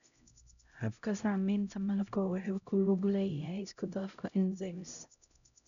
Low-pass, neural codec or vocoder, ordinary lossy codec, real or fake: 7.2 kHz; codec, 16 kHz, 0.5 kbps, X-Codec, HuBERT features, trained on LibriSpeech; MP3, 96 kbps; fake